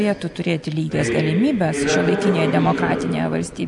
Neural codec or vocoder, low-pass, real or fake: none; 10.8 kHz; real